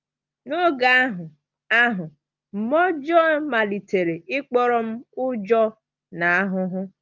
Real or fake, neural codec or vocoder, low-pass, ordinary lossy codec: real; none; 7.2 kHz; Opus, 24 kbps